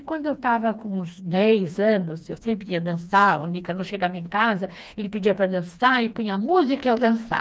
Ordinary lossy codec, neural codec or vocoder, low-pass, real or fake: none; codec, 16 kHz, 2 kbps, FreqCodec, smaller model; none; fake